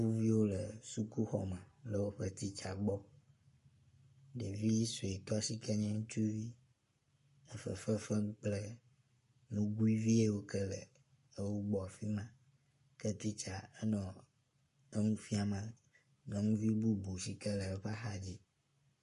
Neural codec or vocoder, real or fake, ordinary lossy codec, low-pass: codec, 44.1 kHz, 7.8 kbps, Pupu-Codec; fake; MP3, 48 kbps; 14.4 kHz